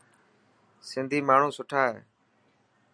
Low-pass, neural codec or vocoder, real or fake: 9.9 kHz; none; real